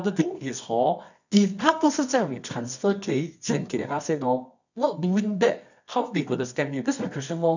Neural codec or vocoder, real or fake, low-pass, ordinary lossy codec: codec, 24 kHz, 0.9 kbps, WavTokenizer, medium music audio release; fake; 7.2 kHz; none